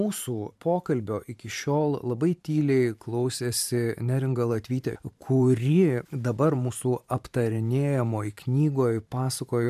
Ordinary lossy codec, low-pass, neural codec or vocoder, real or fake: MP3, 96 kbps; 14.4 kHz; vocoder, 44.1 kHz, 128 mel bands every 512 samples, BigVGAN v2; fake